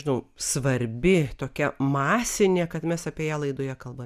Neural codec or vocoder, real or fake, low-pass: none; real; 14.4 kHz